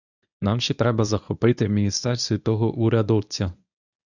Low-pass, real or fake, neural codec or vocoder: 7.2 kHz; fake; codec, 24 kHz, 0.9 kbps, WavTokenizer, medium speech release version 1